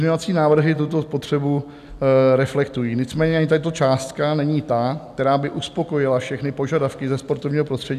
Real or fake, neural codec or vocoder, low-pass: real; none; 14.4 kHz